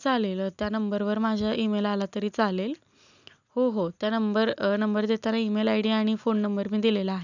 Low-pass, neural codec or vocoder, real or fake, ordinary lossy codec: 7.2 kHz; none; real; none